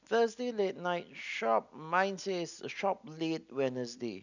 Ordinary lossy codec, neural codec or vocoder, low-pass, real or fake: none; none; 7.2 kHz; real